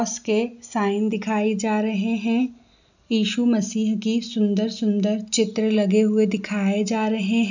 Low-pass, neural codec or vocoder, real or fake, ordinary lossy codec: 7.2 kHz; none; real; none